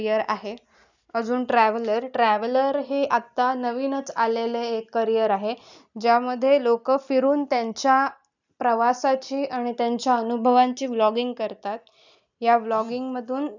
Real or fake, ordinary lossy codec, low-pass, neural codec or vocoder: real; none; 7.2 kHz; none